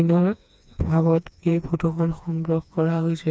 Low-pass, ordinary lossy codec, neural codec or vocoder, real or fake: none; none; codec, 16 kHz, 2 kbps, FreqCodec, smaller model; fake